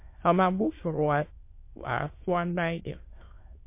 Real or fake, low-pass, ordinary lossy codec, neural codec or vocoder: fake; 3.6 kHz; MP3, 24 kbps; autoencoder, 22.05 kHz, a latent of 192 numbers a frame, VITS, trained on many speakers